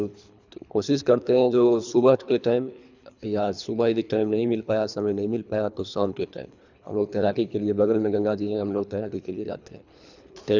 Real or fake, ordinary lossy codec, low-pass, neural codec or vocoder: fake; none; 7.2 kHz; codec, 24 kHz, 3 kbps, HILCodec